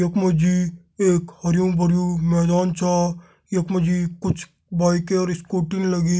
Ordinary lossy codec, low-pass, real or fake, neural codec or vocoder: none; none; real; none